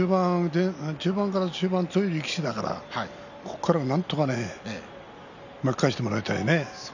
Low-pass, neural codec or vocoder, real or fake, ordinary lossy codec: 7.2 kHz; none; real; none